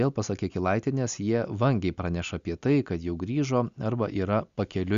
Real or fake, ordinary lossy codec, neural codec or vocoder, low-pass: real; Opus, 64 kbps; none; 7.2 kHz